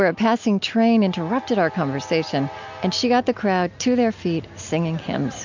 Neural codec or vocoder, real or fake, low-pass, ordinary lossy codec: none; real; 7.2 kHz; MP3, 64 kbps